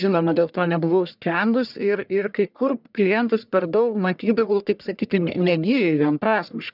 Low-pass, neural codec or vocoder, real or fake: 5.4 kHz; codec, 44.1 kHz, 1.7 kbps, Pupu-Codec; fake